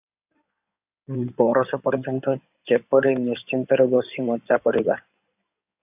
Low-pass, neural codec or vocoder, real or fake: 3.6 kHz; codec, 16 kHz in and 24 kHz out, 2.2 kbps, FireRedTTS-2 codec; fake